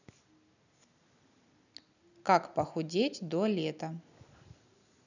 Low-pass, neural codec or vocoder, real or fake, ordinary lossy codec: 7.2 kHz; none; real; none